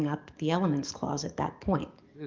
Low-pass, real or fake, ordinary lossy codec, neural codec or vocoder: 7.2 kHz; fake; Opus, 32 kbps; codec, 44.1 kHz, 7.8 kbps, DAC